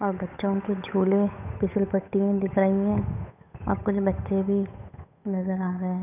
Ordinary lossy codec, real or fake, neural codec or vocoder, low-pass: none; fake; codec, 16 kHz, 8 kbps, FunCodec, trained on Chinese and English, 25 frames a second; 3.6 kHz